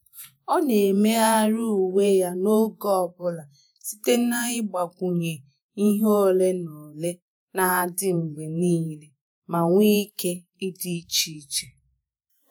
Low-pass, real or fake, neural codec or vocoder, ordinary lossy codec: none; fake; vocoder, 48 kHz, 128 mel bands, Vocos; none